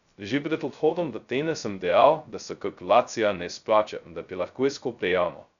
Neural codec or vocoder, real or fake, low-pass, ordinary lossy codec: codec, 16 kHz, 0.2 kbps, FocalCodec; fake; 7.2 kHz; none